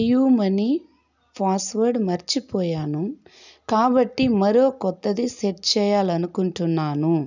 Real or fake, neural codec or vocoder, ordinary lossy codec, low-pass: real; none; none; 7.2 kHz